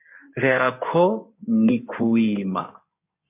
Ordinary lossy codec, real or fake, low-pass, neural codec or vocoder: MP3, 32 kbps; fake; 3.6 kHz; codec, 16 kHz in and 24 kHz out, 2.2 kbps, FireRedTTS-2 codec